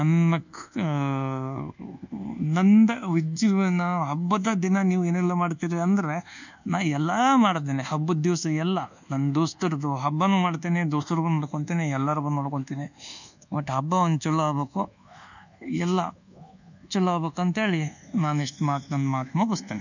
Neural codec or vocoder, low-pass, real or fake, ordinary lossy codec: codec, 24 kHz, 1.2 kbps, DualCodec; 7.2 kHz; fake; none